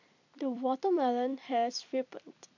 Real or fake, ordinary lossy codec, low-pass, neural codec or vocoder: fake; none; 7.2 kHz; vocoder, 44.1 kHz, 128 mel bands every 256 samples, BigVGAN v2